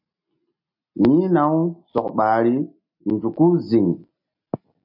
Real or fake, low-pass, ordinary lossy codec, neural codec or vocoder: real; 5.4 kHz; MP3, 32 kbps; none